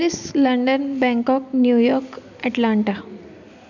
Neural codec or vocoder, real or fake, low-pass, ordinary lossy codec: none; real; 7.2 kHz; none